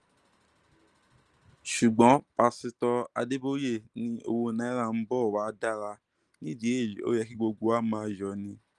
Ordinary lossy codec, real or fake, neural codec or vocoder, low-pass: Opus, 24 kbps; real; none; 10.8 kHz